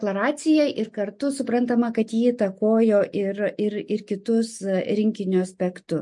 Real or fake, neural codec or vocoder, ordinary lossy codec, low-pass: real; none; MP3, 48 kbps; 10.8 kHz